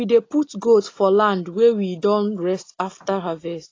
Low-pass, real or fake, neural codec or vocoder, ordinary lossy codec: 7.2 kHz; real; none; AAC, 32 kbps